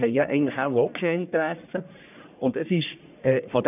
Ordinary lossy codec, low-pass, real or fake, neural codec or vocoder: none; 3.6 kHz; fake; codec, 44.1 kHz, 1.7 kbps, Pupu-Codec